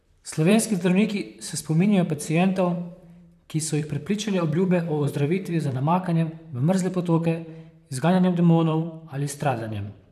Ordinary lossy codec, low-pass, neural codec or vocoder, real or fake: none; 14.4 kHz; vocoder, 44.1 kHz, 128 mel bands, Pupu-Vocoder; fake